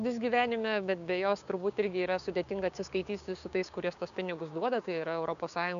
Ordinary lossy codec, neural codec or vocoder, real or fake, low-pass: MP3, 96 kbps; codec, 16 kHz, 6 kbps, DAC; fake; 7.2 kHz